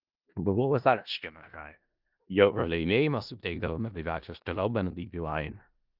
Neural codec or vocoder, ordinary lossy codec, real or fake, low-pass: codec, 16 kHz in and 24 kHz out, 0.4 kbps, LongCat-Audio-Codec, four codebook decoder; Opus, 24 kbps; fake; 5.4 kHz